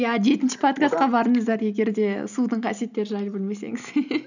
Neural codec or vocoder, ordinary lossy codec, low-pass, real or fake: none; none; 7.2 kHz; real